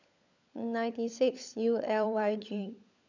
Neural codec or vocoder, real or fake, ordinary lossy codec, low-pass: codec, 16 kHz, 16 kbps, FunCodec, trained on LibriTTS, 50 frames a second; fake; none; 7.2 kHz